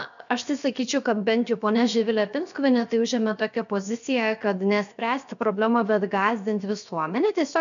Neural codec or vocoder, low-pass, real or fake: codec, 16 kHz, 0.7 kbps, FocalCodec; 7.2 kHz; fake